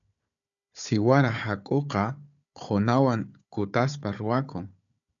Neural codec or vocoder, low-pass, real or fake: codec, 16 kHz, 4 kbps, FunCodec, trained on Chinese and English, 50 frames a second; 7.2 kHz; fake